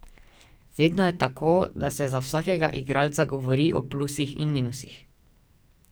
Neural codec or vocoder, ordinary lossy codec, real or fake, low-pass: codec, 44.1 kHz, 2.6 kbps, SNAC; none; fake; none